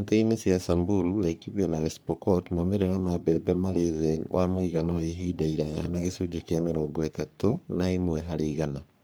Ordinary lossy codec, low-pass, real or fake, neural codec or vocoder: none; none; fake; codec, 44.1 kHz, 3.4 kbps, Pupu-Codec